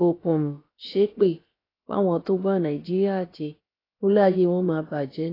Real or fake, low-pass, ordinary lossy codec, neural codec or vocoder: fake; 5.4 kHz; AAC, 24 kbps; codec, 16 kHz, about 1 kbps, DyCAST, with the encoder's durations